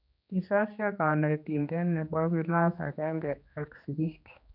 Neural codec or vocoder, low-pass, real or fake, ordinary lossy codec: codec, 16 kHz, 2 kbps, X-Codec, HuBERT features, trained on general audio; 5.4 kHz; fake; none